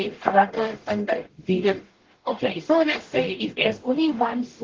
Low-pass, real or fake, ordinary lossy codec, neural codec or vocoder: 7.2 kHz; fake; Opus, 16 kbps; codec, 44.1 kHz, 0.9 kbps, DAC